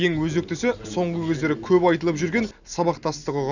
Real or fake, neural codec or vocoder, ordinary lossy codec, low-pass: real; none; none; 7.2 kHz